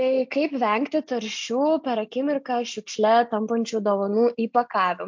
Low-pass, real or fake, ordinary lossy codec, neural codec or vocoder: 7.2 kHz; real; MP3, 48 kbps; none